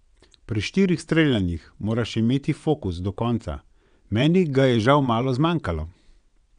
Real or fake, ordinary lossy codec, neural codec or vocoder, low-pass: fake; none; vocoder, 22.05 kHz, 80 mel bands, WaveNeXt; 9.9 kHz